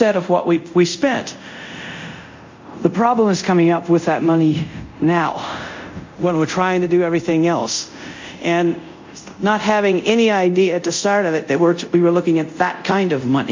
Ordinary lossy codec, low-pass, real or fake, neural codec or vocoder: MP3, 64 kbps; 7.2 kHz; fake; codec, 24 kHz, 0.5 kbps, DualCodec